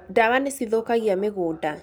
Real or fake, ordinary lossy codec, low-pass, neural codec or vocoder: real; none; none; none